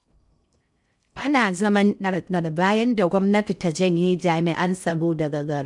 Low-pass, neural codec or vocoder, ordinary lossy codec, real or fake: 10.8 kHz; codec, 16 kHz in and 24 kHz out, 0.6 kbps, FocalCodec, streaming, 2048 codes; none; fake